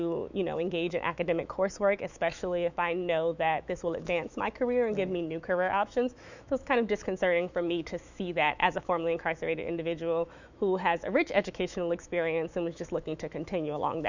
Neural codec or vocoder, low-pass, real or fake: autoencoder, 48 kHz, 128 numbers a frame, DAC-VAE, trained on Japanese speech; 7.2 kHz; fake